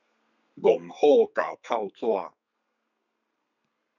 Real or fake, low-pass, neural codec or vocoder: fake; 7.2 kHz; codec, 32 kHz, 1.9 kbps, SNAC